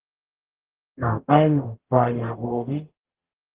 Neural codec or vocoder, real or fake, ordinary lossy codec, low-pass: codec, 44.1 kHz, 0.9 kbps, DAC; fake; Opus, 16 kbps; 3.6 kHz